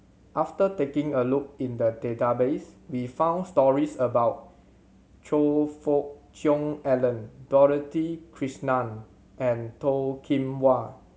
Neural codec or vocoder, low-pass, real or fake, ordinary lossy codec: none; none; real; none